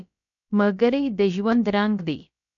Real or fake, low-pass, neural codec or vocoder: fake; 7.2 kHz; codec, 16 kHz, about 1 kbps, DyCAST, with the encoder's durations